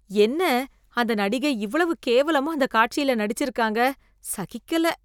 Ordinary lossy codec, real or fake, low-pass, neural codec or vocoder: none; real; 19.8 kHz; none